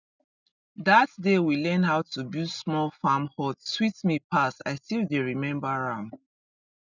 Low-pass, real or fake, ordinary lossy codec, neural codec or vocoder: 7.2 kHz; real; none; none